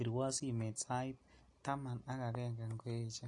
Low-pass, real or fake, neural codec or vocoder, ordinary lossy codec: 10.8 kHz; real; none; MP3, 48 kbps